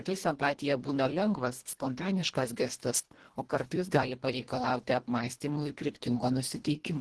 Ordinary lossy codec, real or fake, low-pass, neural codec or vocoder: Opus, 16 kbps; fake; 10.8 kHz; codec, 24 kHz, 1.5 kbps, HILCodec